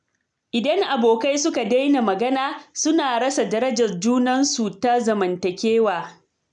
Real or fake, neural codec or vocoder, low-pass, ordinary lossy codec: real; none; 9.9 kHz; none